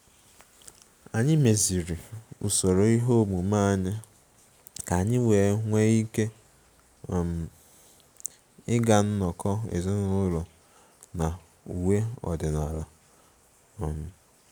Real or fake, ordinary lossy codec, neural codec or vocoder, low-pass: real; none; none; none